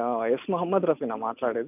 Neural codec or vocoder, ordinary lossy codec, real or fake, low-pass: none; none; real; 3.6 kHz